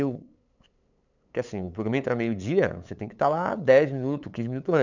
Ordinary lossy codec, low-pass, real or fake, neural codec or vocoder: none; 7.2 kHz; fake; codec, 16 kHz, 8 kbps, FunCodec, trained on LibriTTS, 25 frames a second